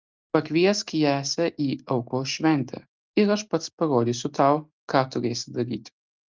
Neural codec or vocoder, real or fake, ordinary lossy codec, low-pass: none; real; Opus, 24 kbps; 7.2 kHz